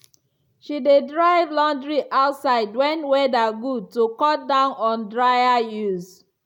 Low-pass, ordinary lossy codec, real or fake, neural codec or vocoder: 19.8 kHz; none; real; none